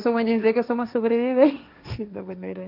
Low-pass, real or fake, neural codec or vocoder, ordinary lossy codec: 5.4 kHz; fake; codec, 16 kHz, 1.1 kbps, Voila-Tokenizer; none